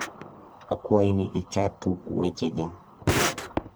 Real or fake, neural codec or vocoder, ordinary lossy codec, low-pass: fake; codec, 44.1 kHz, 1.7 kbps, Pupu-Codec; none; none